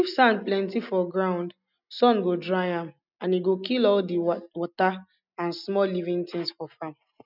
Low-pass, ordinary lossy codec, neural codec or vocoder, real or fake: 5.4 kHz; none; none; real